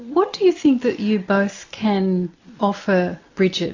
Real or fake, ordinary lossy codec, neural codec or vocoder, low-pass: real; AAC, 48 kbps; none; 7.2 kHz